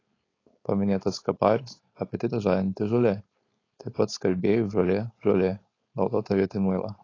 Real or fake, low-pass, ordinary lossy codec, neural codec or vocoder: fake; 7.2 kHz; AAC, 32 kbps; codec, 16 kHz, 4.8 kbps, FACodec